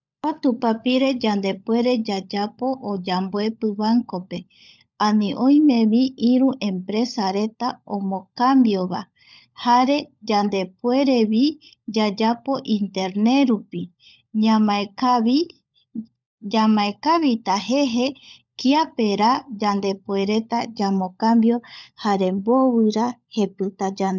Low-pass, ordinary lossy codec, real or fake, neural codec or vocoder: 7.2 kHz; none; fake; codec, 16 kHz, 16 kbps, FunCodec, trained on LibriTTS, 50 frames a second